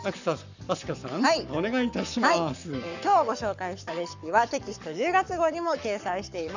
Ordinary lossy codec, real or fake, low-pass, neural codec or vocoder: none; fake; 7.2 kHz; codec, 44.1 kHz, 7.8 kbps, Pupu-Codec